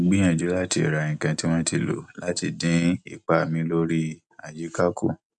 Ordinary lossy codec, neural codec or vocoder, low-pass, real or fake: none; none; 10.8 kHz; real